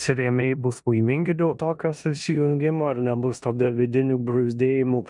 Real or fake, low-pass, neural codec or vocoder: fake; 10.8 kHz; codec, 16 kHz in and 24 kHz out, 0.9 kbps, LongCat-Audio-Codec, four codebook decoder